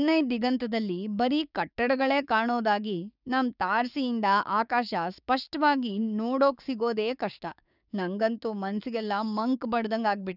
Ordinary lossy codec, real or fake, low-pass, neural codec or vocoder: none; fake; 5.4 kHz; codec, 16 kHz, 6 kbps, DAC